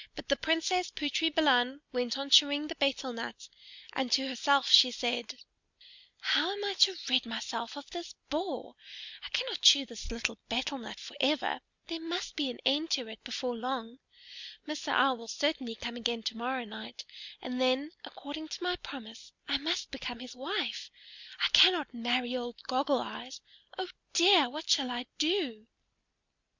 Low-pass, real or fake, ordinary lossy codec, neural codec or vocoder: 7.2 kHz; real; Opus, 64 kbps; none